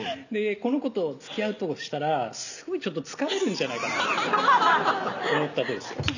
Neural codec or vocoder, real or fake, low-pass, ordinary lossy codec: none; real; 7.2 kHz; none